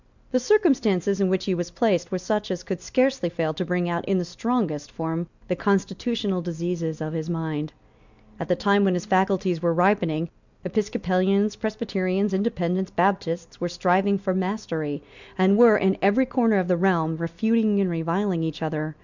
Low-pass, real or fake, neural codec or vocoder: 7.2 kHz; real; none